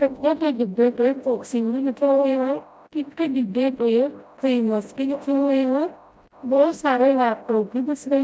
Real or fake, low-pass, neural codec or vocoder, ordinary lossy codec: fake; none; codec, 16 kHz, 0.5 kbps, FreqCodec, smaller model; none